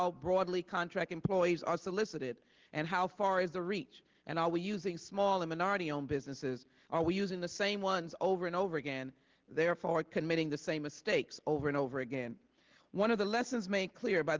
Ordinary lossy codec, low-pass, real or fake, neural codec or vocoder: Opus, 16 kbps; 7.2 kHz; real; none